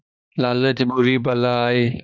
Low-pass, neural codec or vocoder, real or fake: 7.2 kHz; codec, 16 kHz, 2 kbps, X-Codec, HuBERT features, trained on balanced general audio; fake